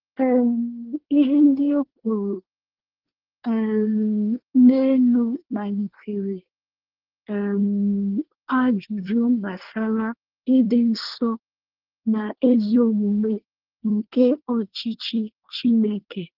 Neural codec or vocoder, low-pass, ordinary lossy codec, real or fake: codec, 24 kHz, 1 kbps, SNAC; 5.4 kHz; Opus, 16 kbps; fake